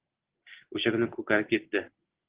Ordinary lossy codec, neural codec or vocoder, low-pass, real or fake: Opus, 16 kbps; none; 3.6 kHz; real